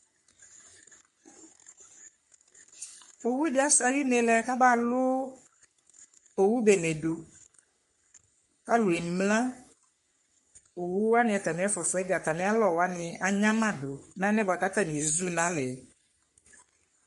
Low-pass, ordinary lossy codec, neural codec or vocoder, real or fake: 14.4 kHz; MP3, 48 kbps; codec, 44.1 kHz, 3.4 kbps, Pupu-Codec; fake